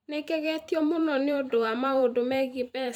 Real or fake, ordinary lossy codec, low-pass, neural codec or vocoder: fake; none; none; vocoder, 44.1 kHz, 128 mel bands every 512 samples, BigVGAN v2